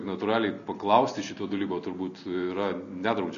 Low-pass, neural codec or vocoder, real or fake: 7.2 kHz; none; real